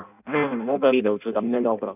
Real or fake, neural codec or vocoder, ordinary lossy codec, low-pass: fake; codec, 16 kHz in and 24 kHz out, 0.6 kbps, FireRedTTS-2 codec; none; 3.6 kHz